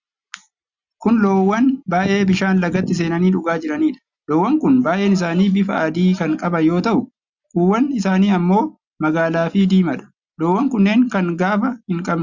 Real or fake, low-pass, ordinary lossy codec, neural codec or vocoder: real; 7.2 kHz; Opus, 64 kbps; none